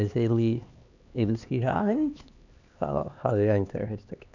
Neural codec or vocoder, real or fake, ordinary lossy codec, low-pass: codec, 16 kHz, 2 kbps, X-Codec, HuBERT features, trained on LibriSpeech; fake; none; 7.2 kHz